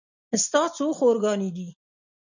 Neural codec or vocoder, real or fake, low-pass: none; real; 7.2 kHz